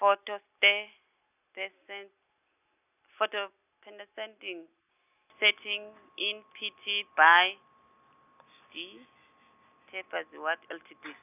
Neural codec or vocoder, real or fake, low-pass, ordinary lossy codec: none; real; 3.6 kHz; none